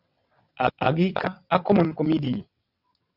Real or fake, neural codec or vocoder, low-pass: fake; vocoder, 22.05 kHz, 80 mel bands, WaveNeXt; 5.4 kHz